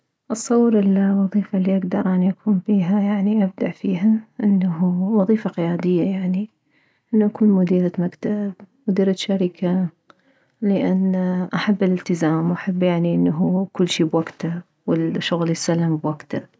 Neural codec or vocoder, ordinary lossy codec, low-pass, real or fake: none; none; none; real